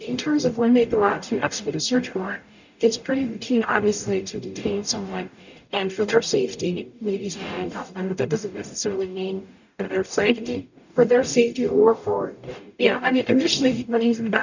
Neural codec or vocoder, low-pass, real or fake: codec, 44.1 kHz, 0.9 kbps, DAC; 7.2 kHz; fake